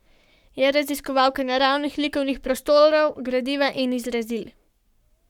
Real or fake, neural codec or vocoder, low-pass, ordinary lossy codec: fake; codec, 44.1 kHz, 7.8 kbps, Pupu-Codec; 19.8 kHz; none